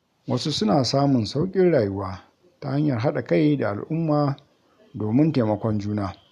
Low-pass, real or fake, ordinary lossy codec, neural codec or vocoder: 14.4 kHz; real; none; none